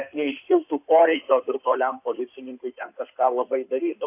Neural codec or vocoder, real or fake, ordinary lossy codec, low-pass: codec, 16 kHz in and 24 kHz out, 2.2 kbps, FireRedTTS-2 codec; fake; AAC, 32 kbps; 3.6 kHz